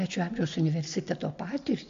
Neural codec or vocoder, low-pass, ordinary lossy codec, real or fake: none; 7.2 kHz; AAC, 48 kbps; real